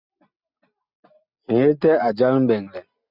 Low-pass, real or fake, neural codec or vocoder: 5.4 kHz; real; none